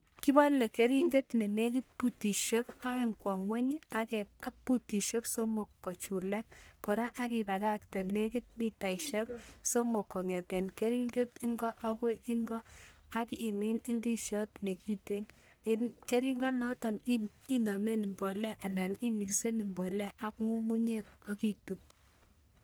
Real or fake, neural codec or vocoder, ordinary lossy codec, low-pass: fake; codec, 44.1 kHz, 1.7 kbps, Pupu-Codec; none; none